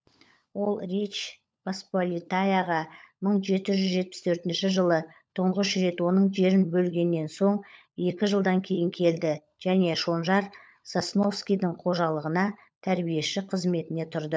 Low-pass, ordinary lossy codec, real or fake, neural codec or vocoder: none; none; fake; codec, 16 kHz, 16 kbps, FunCodec, trained on LibriTTS, 50 frames a second